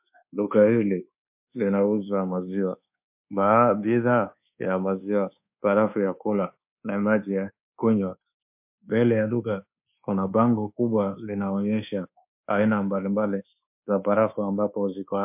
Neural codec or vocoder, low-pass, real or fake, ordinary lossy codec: codec, 24 kHz, 1.2 kbps, DualCodec; 3.6 kHz; fake; MP3, 32 kbps